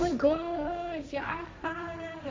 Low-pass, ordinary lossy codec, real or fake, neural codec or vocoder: none; none; fake; codec, 16 kHz, 1.1 kbps, Voila-Tokenizer